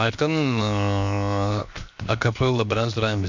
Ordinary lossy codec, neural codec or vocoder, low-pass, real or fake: MP3, 64 kbps; codec, 16 kHz, 0.7 kbps, FocalCodec; 7.2 kHz; fake